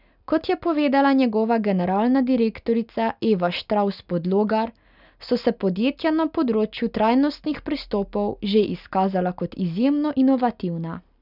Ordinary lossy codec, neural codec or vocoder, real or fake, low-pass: none; none; real; 5.4 kHz